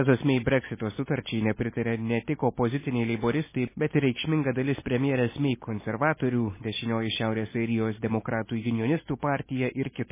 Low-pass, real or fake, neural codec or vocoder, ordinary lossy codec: 3.6 kHz; real; none; MP3, 16 kbps